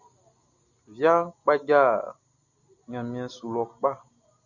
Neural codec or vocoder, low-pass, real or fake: none; 7.2 kHz; real